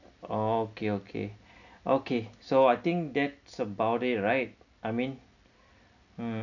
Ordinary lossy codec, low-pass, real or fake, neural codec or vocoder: none; 7.2 kHz; real; none